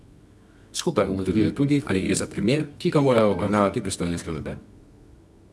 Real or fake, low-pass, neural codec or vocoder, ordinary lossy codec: fake; none; codec, 24 kHz, 0.9 kbps, WavTokenizer, medium music audio release; none